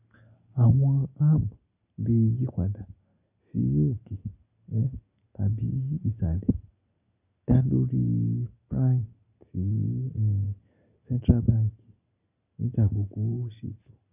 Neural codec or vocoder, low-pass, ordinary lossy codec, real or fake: none; 3.6 kHz; none; real